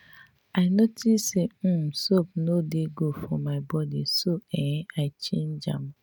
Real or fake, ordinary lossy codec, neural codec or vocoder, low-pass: real; none; none; none